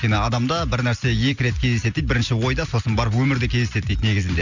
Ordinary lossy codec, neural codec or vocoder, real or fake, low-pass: none; none; real; 7.2 kHz